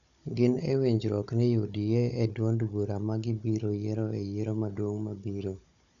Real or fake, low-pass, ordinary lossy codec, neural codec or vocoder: fake; 7.2 kHz; none; codec, 16 kHz, 16 kbps, FunCodec, trained on Chinese and English, 50 frames a second